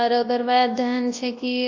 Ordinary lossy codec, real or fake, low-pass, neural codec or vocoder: AAC, 48 kbps; fake; 7.2 kHz; codec, 24 kHz, 0.9 kbps, WavTokenizer, large speech release